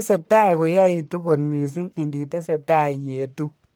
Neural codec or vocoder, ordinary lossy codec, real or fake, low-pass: codec, 44.1 kHz, 1.7 kbps, Pupu-Codec; none; fake; none